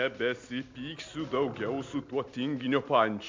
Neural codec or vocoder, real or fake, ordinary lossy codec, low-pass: none; real; MP3, 64 kbps; 7.2 kHz